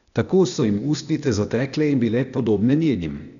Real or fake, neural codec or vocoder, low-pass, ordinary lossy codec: fake; codec, 16 kHz, 0.8 kbps, ZipCodec; 7.2 kHz; none